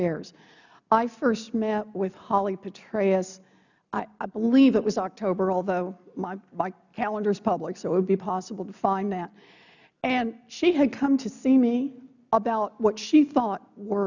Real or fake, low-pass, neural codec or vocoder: real; 7.2 kHz; none